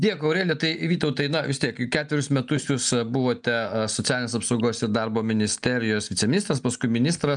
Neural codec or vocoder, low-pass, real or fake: none; 9.9 kHz; real